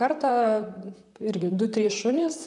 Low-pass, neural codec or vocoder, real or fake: 10.8 kHz; vocoder, 44.1 kHz, 128 mel bands, Pupu-Vocoder; fake